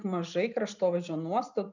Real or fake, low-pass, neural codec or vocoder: real; 7.2 kHz; none